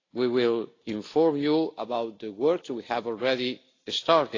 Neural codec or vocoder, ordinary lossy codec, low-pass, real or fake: none; AAC, 32 kbps; 7.2 kHz; real